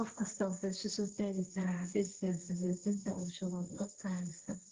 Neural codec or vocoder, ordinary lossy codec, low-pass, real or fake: codec, 16 kHz, 1.1 kbps, Voila-Tokenizer; Opus, 16 kbps; 7.2 kHz; fake